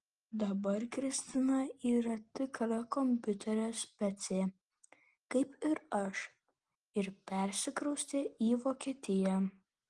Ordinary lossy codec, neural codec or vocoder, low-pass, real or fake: Opus, 24 kbps; none; 10.8 kHz; real